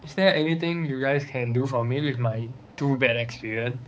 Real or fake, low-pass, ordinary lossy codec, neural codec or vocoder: fake; none; none; codec, 16 kHz, 4 kbps, X-Codec, HuBERT features, trained on balanced general audio